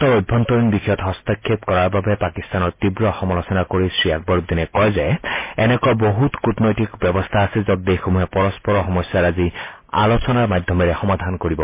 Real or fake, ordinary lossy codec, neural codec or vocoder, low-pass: real; MP3, 24 kbps; none; 3.6 kHz